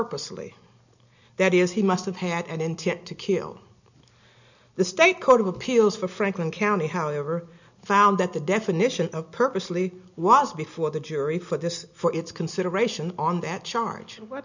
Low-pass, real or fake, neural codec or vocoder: 7.2 kHz; real; none